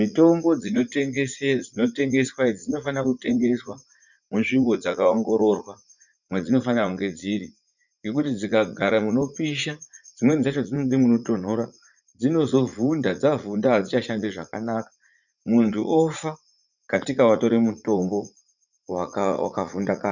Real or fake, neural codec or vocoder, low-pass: fake; vocoder, 44.1 kHz, 80 mel bands, Vocos; 7.2 kHz